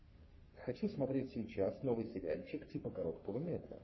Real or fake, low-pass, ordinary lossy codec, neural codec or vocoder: fake; 7.2 kHz; MP3, 24 kbps; codec, 44.1 kHz, 3.4 kbps, Pupu-Codec